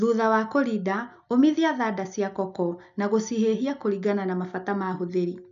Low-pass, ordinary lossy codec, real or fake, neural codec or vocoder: 7.2 kHz; none; real; none